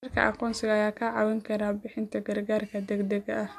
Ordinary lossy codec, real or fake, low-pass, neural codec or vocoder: MP3, 64 kbps; real; 14.4 kHz; none